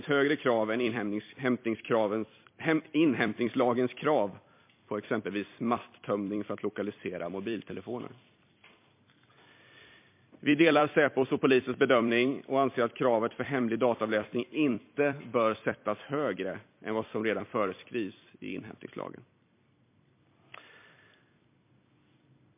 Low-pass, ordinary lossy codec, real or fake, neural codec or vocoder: 3.6 kHz; MP3, 24 kbps; fake; vocoder, 44.1 kHz, 128 mel bands every 256 samples, BigVGAN v2